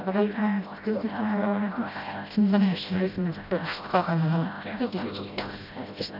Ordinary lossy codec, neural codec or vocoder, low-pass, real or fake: none; codec, 16 kHz, 0.5 kbps, FreqCodec, smaller model; 5.4 kHz; fake